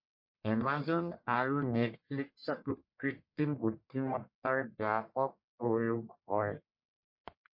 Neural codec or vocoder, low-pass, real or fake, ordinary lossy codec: codec, 44.1 kHz, 1.7 kbps, Pupu-Codec; 5.4 kHz; fake; MP3, 32 kbps